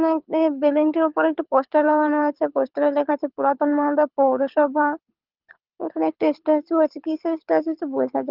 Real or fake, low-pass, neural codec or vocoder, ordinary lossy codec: fake; 5.4 kHz; codec, 24 kHz, 6 kbps, HILCodec; Opus, 24 kbps